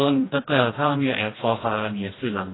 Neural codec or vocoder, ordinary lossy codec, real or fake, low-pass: codec, 16 kHz, 1 kbps, FreqCodec, smaller model; AAC, 16 kbps; fake; 7.2 kHz